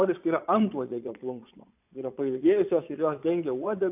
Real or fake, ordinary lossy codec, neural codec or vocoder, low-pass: fake; AAC, 32 kbps; vocoder, 22.05 kHz, 80 mel bands, Vocos; 3.6 kHz